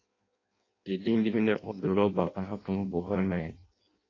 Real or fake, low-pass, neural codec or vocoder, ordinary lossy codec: fake; 7.2 kHz; codec, 16 kHz in and 24 kHz out, 0.6 kbps, FireRedTTS-2 codec; AAC, 32 kbps